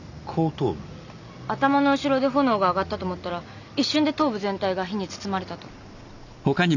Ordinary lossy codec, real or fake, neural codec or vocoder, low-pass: none; real; none; 7.2 kHz